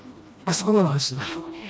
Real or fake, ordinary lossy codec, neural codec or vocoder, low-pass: fake; none; codec, 16 kHz, 1 kbps, FreqCodec, smaller model; none